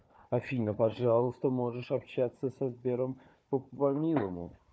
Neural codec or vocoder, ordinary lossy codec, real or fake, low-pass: codec, 16 kHz, 4 kbps, FunCodec, trained on Chinese and English, 50 frames a second; none; fake; none